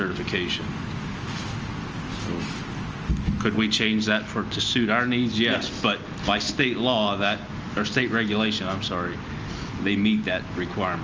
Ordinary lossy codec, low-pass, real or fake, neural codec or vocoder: Opus, 24 kbps; 7.2 kHz; real; none